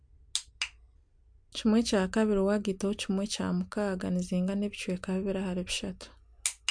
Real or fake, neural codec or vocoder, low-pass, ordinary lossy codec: real; none; 9.9 kHz; MP3, 64 kbps